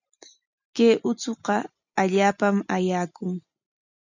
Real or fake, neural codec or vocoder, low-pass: real; none; 7.2 kHz